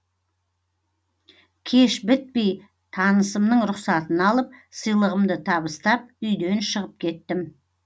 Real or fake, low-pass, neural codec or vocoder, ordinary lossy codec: real; none; none; none